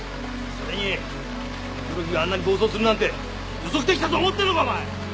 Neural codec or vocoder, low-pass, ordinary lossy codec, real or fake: none; none; none; real